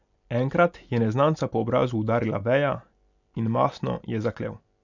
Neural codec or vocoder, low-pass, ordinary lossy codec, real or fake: none; 7.2 kHz; none; real